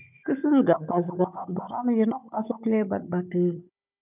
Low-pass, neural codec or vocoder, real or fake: 3.6 kHz; codec, 16 kHz, 16 kbps, FunCodec, trained on Chinese and English, 50 frames a second; fake